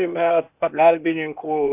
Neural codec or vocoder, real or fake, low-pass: codec, 16 kHz, 0.8 kbps, ZipCodec; fake; 3.6 kHz